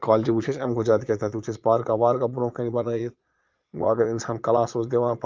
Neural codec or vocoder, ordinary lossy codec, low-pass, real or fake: vocoder, 22.05 kHz, 80 mel bands, Vocos; Opus, 32 kbps; 7.2 kHz; fake